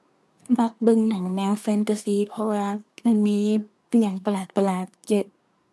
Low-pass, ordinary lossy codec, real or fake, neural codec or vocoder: none; none; fake; codec, 24 kHz, 1 kbps, SNAC